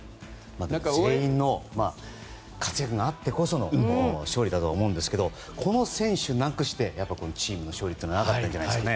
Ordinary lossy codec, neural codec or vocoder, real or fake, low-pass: none; none; real; none